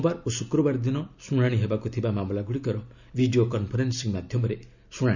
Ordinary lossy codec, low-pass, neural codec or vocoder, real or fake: none; 7.2 kHz; none; real